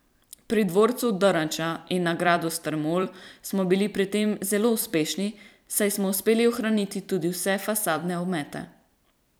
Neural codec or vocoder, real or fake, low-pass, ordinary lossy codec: vocoder, 44.1 kHz, 128 mel bands every 256 samples, BigVGAN v2; fake; none; none